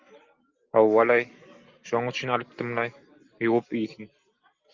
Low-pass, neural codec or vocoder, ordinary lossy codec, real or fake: 7.2 kHz; none; Opus, 24 kbps; real